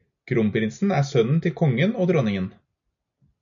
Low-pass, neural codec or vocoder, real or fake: 7.2 kHz; none; real